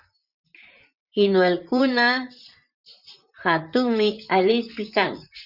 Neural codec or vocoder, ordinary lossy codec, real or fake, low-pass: vocoder, 44.1 kHz, 128 mel bands, Pupu-Vocoder; Opus, 64 kbps; fake; 5.4 kHz